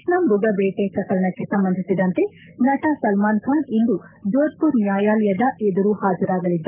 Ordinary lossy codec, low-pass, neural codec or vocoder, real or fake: none; 3.6 kHz; codec, 44.1 kHz, 7.8 kbps, Pupu-Codec; fake